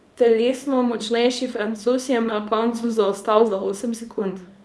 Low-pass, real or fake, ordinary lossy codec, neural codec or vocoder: none; fake; none; codec, 24 kHz, 0.9 kbps, WavTokenizer, small release